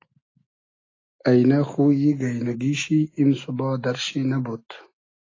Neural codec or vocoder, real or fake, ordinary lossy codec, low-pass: none; real; AAC, 32 kbps; 7.2 kHz